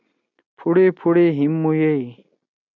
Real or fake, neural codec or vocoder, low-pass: real; none; 7.2 kHz